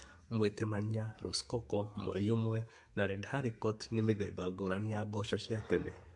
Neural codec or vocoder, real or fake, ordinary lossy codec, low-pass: codec, 32 kHz, 1.9 kbps, SNAC; fake; MP3, 64 kbps; 10.8 kHz